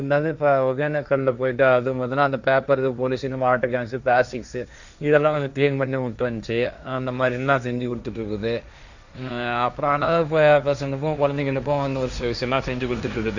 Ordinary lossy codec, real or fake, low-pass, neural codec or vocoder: none; fake; 7.2 kHz; codec, 16 kHz, 1.1 kbps, Voila-Tokenizer